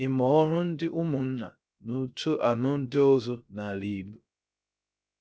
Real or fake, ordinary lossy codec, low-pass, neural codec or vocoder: fake; none; none; codec, 16 kHz, 0.3 kbps, FocalCodec